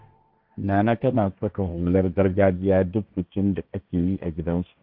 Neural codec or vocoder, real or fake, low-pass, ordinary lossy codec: codec, 16 kHz, 1.1 kbps, Voila-Tokenizer; fake; 5.4 kHz; none